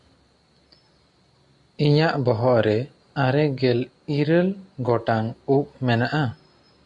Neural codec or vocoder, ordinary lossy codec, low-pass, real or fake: none; MP3, 64 kbps; 10.8 kHz; real